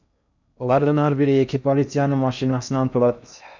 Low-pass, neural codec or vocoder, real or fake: 7.2 kHz; codec, 16 kHz in and 24 kHz out, 0.6 kbps, FocalCodec, streaming, 2048 codes; fake